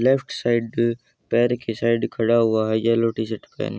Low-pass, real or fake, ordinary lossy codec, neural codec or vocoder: none; real; none; none